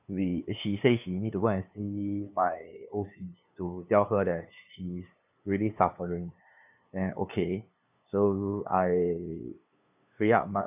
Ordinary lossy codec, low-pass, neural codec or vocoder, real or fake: none; 3.6 kHz; codec, 16 kHz, 2 kbps, FunCodec, trained on LibriTTS, 25 frames a second; fake